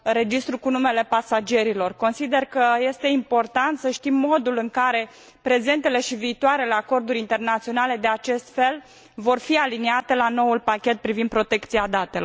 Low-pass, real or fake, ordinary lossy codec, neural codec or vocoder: none; real; none; none